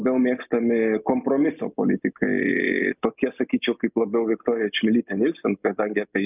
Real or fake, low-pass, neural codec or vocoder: real; 3.6 kHz; none